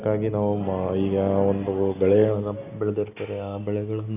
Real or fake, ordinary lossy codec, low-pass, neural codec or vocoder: real; none; 3.6 kHz; none